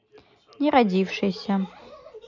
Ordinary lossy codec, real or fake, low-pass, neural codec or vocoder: none; real; 7.2 kHz; none